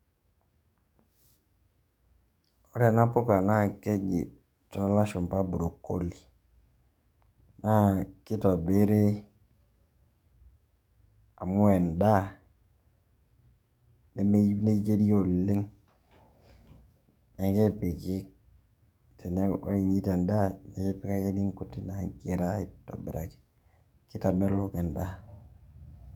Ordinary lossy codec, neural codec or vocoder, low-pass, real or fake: none; codec, 44.1 kHz, 7.8 kbps, DAC; 19.8 kHz; fake